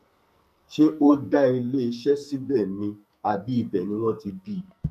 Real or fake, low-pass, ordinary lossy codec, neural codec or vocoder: fake; 14.4 kHz; none; codec, 44.1 kHz, 2.6 kbps, SNAC